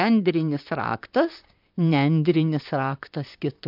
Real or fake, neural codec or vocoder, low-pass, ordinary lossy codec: fake; vocoder, 44.1 kHz, 128 mel bands, Pupu-Vocoder; 5.4 kHz; MP3, 48 kbps